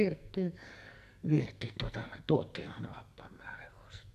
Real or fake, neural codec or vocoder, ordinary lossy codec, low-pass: fake; codec, 44.1 kHz, 2.6 kbps, SNAC; MP3, 96 kbps; 14.4 kHz